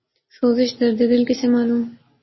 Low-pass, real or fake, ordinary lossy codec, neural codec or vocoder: 7.2 kHz; real; MP3, 24 kbps; none